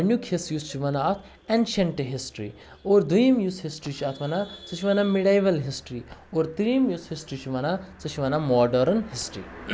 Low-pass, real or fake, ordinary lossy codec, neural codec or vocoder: none; real; none; none